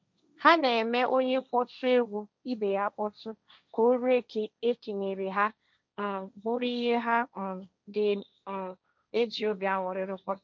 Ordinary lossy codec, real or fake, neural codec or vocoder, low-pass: none; fake; codec, 16 kHz, 1.1 kbps, Voila-Tokenizer; none